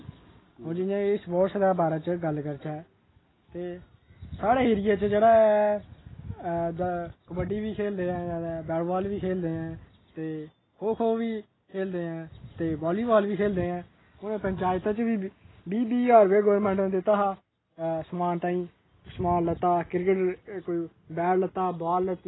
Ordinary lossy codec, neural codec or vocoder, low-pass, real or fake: AAC, 16 kbps; none; 7.2 kHz; real